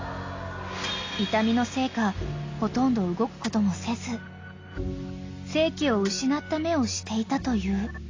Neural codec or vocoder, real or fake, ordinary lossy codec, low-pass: none; real; AAC, 32 kbps; 7.2 kHz